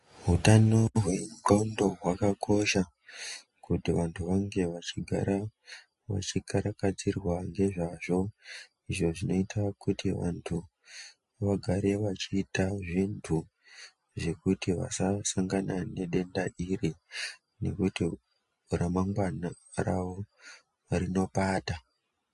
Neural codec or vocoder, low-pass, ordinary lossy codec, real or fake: none; 14.4 kHz; MP3, 48 kbps; real